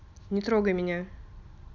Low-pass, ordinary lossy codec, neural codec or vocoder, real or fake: 7.2 kHz; none; none; real